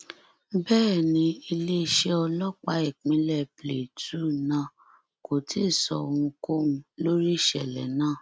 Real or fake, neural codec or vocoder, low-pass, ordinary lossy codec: real; none; none; none